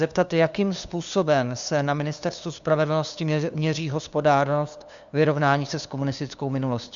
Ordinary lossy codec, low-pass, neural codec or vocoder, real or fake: Opus, 64 kbps; 7.2 kHz; codec, 16 kHz, 2 kbps, FunCodec, trained on LibriTTS, 25 frames a second; fake